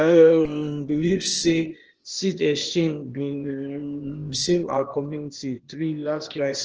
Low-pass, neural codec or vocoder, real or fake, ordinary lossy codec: 7.2 kHz; codec, 16 kHz, 0.8 kbps, ZipCodec; fake; Opus, 16 kbps